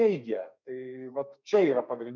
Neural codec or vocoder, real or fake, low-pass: codec, 32 kHz, 1.9 kbps, SNAC; fake; 7.2 kHz